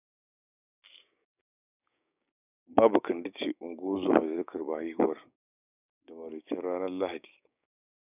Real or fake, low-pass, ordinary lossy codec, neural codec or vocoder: fake; 3.6 kHz; none; codec, 16 kHz, 6 kbps, DAC